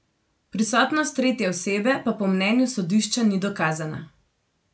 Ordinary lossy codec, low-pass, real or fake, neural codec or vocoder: none; none; real; none